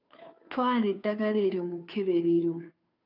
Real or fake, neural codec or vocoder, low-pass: fake; codec, 16 kHz, 2 kbps, FunCodec, trained on Chinese and English, 25 frames a second; 5.4 kHz